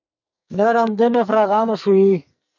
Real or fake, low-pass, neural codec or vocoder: fake; 7.2 kHz; codec, 44.1 kHz, 2.6 kbps, SNAC